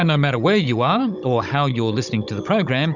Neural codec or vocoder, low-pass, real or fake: codec, 16 kHz, 16 kbps, FunCodec, trained on Chinese and English, 50 frames a second; 7.2 kHz; fake